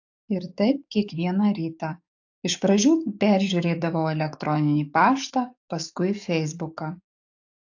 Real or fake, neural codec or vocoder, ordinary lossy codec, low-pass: fake; codec, 44.1 kHz, 7.8 kbps, DAC; AAC, 48 kbps; 7.2 kHz